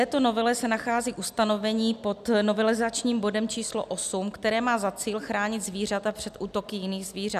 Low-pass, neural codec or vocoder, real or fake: 14.4 kHz; none; real